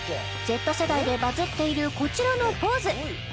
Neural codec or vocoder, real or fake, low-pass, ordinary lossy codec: none; real; none; none